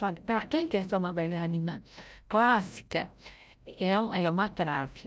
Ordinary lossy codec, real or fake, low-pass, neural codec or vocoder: none; fake; none; codec, 16 kHz, 0.5 kbps, FreqCodec, larger model